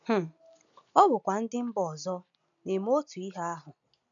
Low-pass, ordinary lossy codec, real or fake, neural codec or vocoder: 7.2 kHz; none; real; none